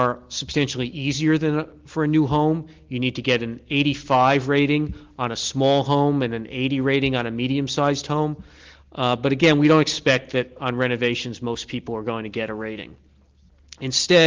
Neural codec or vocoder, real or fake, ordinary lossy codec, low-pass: none; real; Opus, 16 kbps; 7.2 kHz